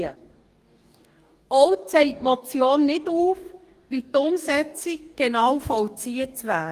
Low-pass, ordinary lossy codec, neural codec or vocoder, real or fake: 14.4 kHz; Opus, 32 kbps; codec, 44.1 kHz, 2.6 kbps, DAC; fake